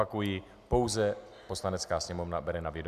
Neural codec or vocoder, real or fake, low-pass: none; real; 14.4 kHz